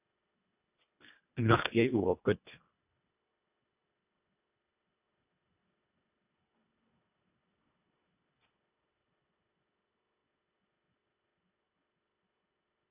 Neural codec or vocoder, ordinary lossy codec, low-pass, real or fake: codec, 24 kHz, 1.5 kbps, HILCodec; AAC, 32 kbps; 3.6 kHz; fake